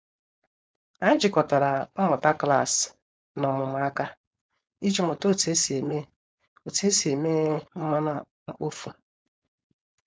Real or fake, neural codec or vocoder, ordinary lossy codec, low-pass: fake; codec, 16 kHz, 4.8 kbps, FACodec; none; none